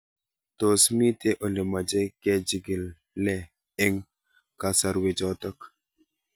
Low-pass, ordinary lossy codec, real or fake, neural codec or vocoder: none; none; real; none